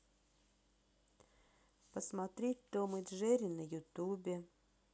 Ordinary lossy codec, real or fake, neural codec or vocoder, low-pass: none; real; none; none